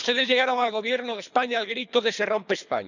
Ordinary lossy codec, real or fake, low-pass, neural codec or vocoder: none; fake; 7.2 kHz; codec, 24 kHz, 3 kbps, HILCodec